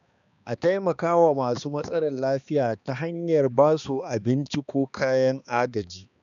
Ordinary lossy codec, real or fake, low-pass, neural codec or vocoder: none; fake; 7.2 kHz; codec, 16 kHz, 2 kbps, X-Codec, HuBERT features, trained on balanced general audio